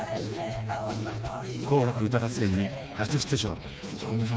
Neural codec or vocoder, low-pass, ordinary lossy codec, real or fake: codec, 16 kHz, 2 kbps, FreqCodec, smaller model; none; none; fake